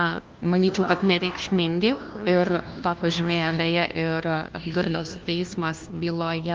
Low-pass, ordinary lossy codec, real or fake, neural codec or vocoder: 7.2 kHz; Opus, 32 kbps; fake; codec, 16 kHz, 1 kbps, FunCodec, trained on LibriTTS, 50 frames a second